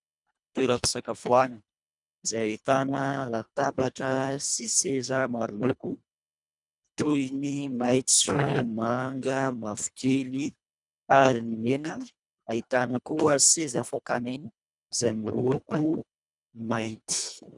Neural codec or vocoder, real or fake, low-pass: codec, 24 kHz, 1.5 kbps, HILCodec; fake; 10.8 kHz